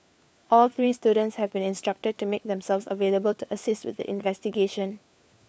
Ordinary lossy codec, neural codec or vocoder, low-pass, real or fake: none; codec, 16 kHz, 4 kbps, FunCodec, trained on LibriTTS, 50 frames a second; none; fake